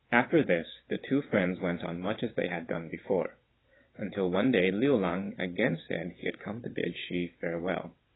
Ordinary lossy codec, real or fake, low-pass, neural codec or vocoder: AAC, 16 kbps; fake; 7.2 kHz; vocoder, 22.05 kHz, 80 mel bands, WaveNeXt